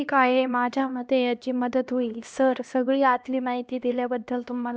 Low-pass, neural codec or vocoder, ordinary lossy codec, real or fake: none; codec, 16 kHz, 1 kbps, X-Codec, HuBERT features, trained on LibriSpeech; none; fake